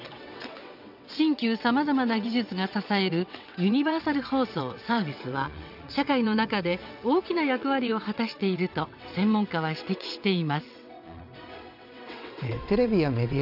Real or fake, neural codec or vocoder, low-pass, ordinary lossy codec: fake; vocoder, 22.05 kHz, 80 mel bands, WaveNeXt; 5.4 kHz; none